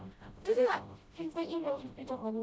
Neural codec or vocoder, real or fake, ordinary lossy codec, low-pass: codec, 16 kHz, 0.5 kbps, FreqCodec, smaller model; fake; none; none